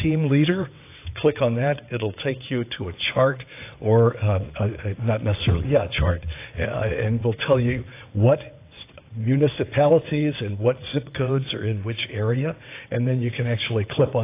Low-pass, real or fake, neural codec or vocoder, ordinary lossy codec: 3.6 kHz; fake; vocoder, 22.05 kHz, 80 mel bands, WaveNeXt; AAC, 24 kbps